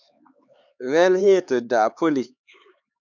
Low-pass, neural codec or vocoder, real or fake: 7.2 kHz; codec, 16 kHz, 4 kbps, X-Codec, HuBERT features, trained on LibriSpeech; fake